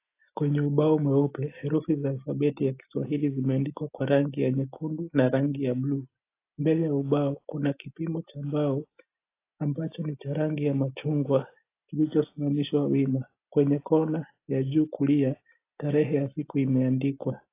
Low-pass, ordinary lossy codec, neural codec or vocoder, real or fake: 3.6 kHz; AAC, 24 kbps; none; real